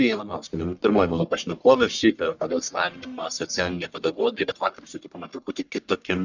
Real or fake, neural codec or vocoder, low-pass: fake; codec, 44.1 kHz, 1.7 kbps, Pupu-Codec; 7.2 kHz